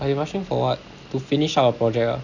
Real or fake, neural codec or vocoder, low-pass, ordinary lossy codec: real; none; 7.2 kHz; none